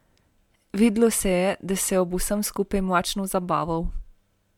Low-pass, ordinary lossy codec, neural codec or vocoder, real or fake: 19.8 kHz; MP3, 96 kbps; none; real